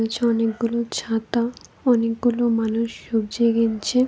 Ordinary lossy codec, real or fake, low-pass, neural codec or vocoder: none; real; none; none